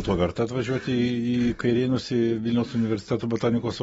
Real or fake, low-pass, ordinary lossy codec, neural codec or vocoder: real; 19.8 kHz; AAC, 24 kbps; none